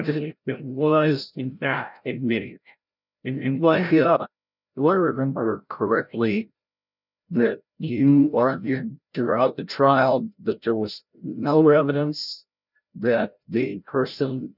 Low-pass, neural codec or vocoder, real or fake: 5.4 kHz; codec, 16 kHz, 0.5 kbps, FreqCodec, larger model; fake